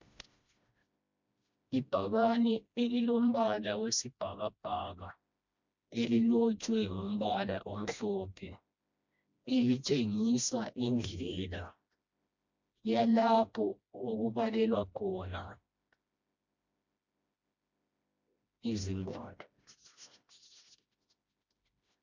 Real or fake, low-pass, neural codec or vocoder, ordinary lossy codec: fake; 7.2 kHz; codec, 16 kHz, 1 kbps, FreqCodec, smaller model; MP3, 64 kbps